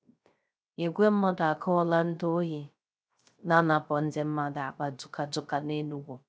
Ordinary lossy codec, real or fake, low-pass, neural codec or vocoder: none; fake; none; codec, 16 kHz, 0.3 kbps, FocalCodec